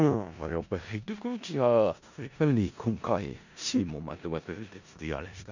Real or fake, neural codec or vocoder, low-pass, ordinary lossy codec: fake; codec, 16 kHz in and 24 kHz out, 0.4 kbps, LongCat-Audio-Codec, four codebook decoder; 7.2 kHz; none